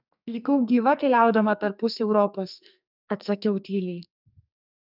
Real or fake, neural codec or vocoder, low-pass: fake; codec, 44.1 kHz, 2.6 kbps, SNAC; 5.4 kHz